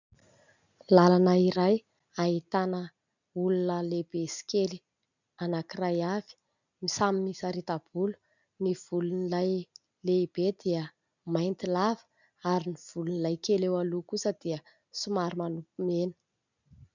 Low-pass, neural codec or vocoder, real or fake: 7.2 kHz; none; real